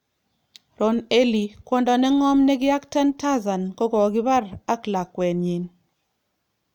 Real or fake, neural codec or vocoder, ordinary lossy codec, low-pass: real; none; none; 19.8 kHz